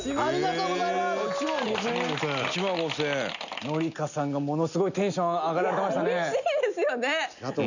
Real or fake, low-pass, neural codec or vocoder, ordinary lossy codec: real; 7.2 kHz; none; none